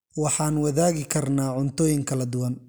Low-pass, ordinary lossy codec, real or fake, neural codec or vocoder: none; none; real; none